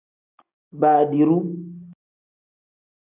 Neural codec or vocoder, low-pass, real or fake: none; 3.6 kHz; real